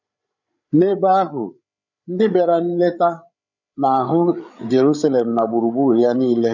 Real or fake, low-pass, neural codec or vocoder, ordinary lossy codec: fake; 7.2 kHz; codec, 16 kHz, 8 kbps, FreqCodec, larger model; none